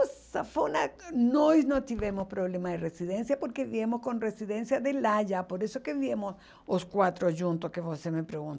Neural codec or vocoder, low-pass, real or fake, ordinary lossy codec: none; none; real; none